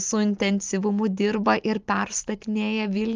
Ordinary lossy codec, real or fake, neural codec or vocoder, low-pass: Opus, 24 kbps; real; none; 7.2 kHz